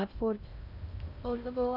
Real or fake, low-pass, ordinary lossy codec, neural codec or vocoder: fake; 5.4 kHz; none; codec, 16 kHz in and 24 kHz out, 0.6 kbps, FocalCodec, streaming, 2048 codes